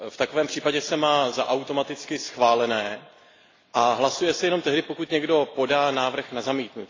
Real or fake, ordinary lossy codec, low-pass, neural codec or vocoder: real; AAC, 32 kbps; 7.2 kHz; none